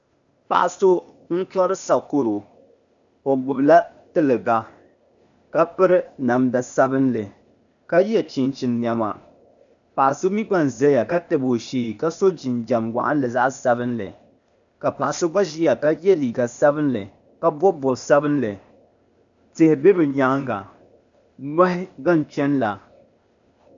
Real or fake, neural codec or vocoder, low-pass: fake; codec, 16 kHz, 0.8 kbps, ZipCodec; 7.2 kHz